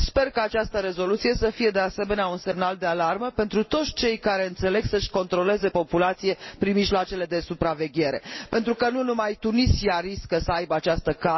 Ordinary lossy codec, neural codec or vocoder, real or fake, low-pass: MP3, 24 kbps; none; real; 7.2 kHz